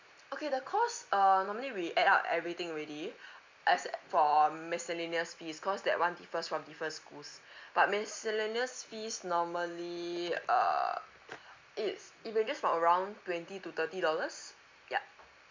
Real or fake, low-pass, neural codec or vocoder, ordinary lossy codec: real; 7.2 kHz; none; MP3, 64 kbps